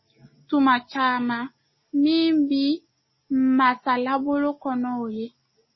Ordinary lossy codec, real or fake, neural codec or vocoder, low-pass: MP3, 24 kbps; real; none; 7.2 kHz